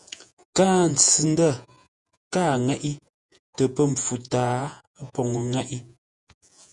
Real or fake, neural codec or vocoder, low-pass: fake; vocoder, 48 kHz, 128 mel bands, Vocos; 10.8 kHz